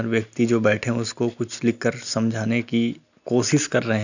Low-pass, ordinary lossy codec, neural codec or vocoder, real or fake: 7.2 kHz; none; none; real